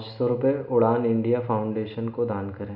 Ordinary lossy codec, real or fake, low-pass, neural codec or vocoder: MP3, 48 kbps; real; 5.4 kHz; none